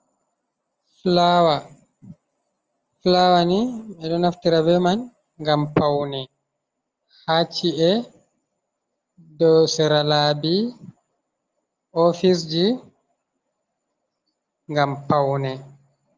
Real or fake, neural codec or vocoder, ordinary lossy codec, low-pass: real; none; Opus, 24 kbps; 7.2 kHz